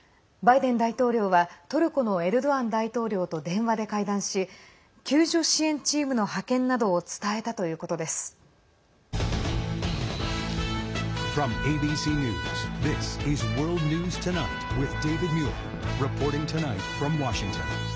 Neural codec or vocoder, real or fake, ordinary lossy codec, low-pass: none; real; none; none